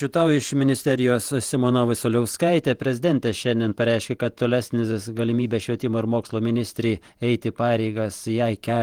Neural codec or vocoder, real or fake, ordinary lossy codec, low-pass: vocoder, 48 kHz, 128 mel bands, Vocos; fake; Opus, 24 kbps; 19.8 kHz